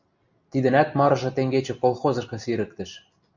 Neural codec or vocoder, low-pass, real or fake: none; 7.2 kHz; real